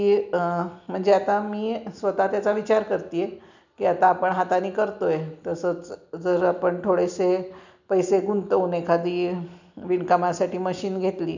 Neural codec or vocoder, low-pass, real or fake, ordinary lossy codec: none; 7.2 kHz; real; none